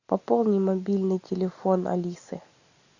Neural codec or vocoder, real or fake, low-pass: none; real; 7.2 kHz